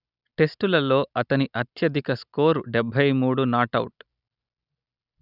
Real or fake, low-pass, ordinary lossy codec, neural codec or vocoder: real; 5.4 kHz; none; none